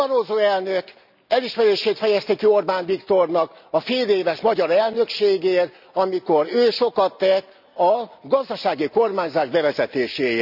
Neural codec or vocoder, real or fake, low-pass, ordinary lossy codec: none; real; 5.4 kHz; none